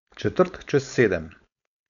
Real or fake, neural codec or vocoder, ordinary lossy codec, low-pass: fake; codec, 16 kHz, 4.8 kbps, FACodec; none; 7.2 kHz